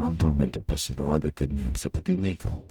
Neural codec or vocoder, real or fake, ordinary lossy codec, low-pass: codec, 44.1 kHz, 0.9 kbps, DAC; fake; Opus, 64 kbps; 19.8 kHz